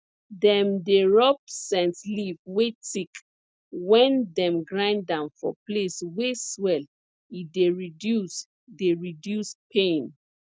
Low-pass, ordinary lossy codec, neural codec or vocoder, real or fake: none; none; none; real